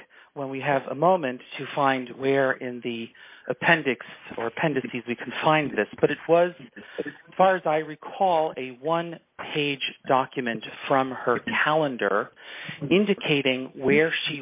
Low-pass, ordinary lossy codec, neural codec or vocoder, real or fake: 3.6 kHz; MP3, 32 kbps; none; real